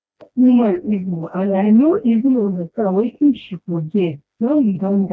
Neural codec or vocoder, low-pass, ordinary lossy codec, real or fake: codec, 16 kHz, 1 kbps, FreqCodec, smaller model; none; none; fake